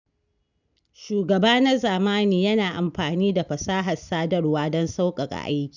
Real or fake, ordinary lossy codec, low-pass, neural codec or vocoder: real; none; 7.2 kHz; none